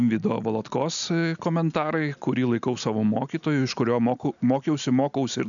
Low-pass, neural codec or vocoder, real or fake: 7.2 kHz; none; real